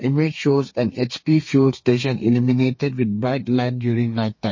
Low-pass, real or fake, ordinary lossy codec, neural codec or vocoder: 7.2 kHz; fake; MP3, 32 kbps; codec, 32 kHz, 1.9 kbps, SNAC